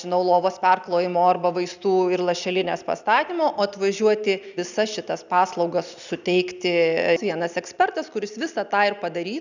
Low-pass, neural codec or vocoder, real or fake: 7.2 kHz; none; real